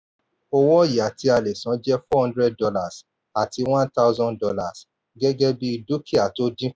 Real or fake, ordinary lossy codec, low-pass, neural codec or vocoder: real; none; none; none